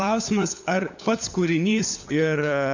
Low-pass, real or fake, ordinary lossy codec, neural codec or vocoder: 7.2 kHz; fake; AAC, 48 kbps; codec, 16 kHz, 8 kbps, FunCodec, trained on LibriTTS, 25 frames a second